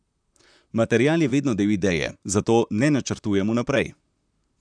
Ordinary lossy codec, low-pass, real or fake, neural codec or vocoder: none; 9.9 kHz; fake; vocoder, 44.1 kHz, 128 mel bands, Pupu-Vocoder